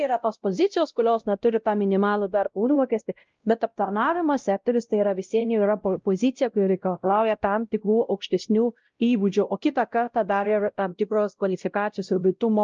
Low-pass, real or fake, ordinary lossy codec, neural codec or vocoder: 7.2 kHz; fake; Opus, 24 kbps; codec, 16 kHz, 0.5 kbps, X-Codec, WavLM features, trained on Multilingual LibriSpeech